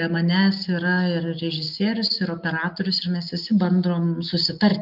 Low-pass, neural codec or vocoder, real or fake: 5.4 kHz; none; real